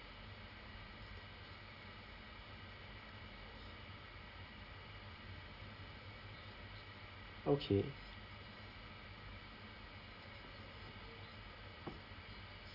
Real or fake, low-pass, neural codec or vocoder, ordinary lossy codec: real; 5.4 kHz; none; none